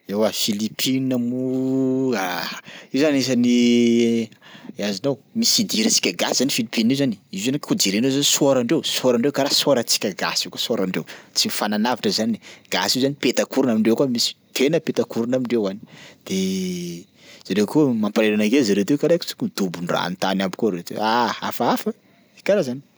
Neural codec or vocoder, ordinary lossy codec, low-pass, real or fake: none; none; none; real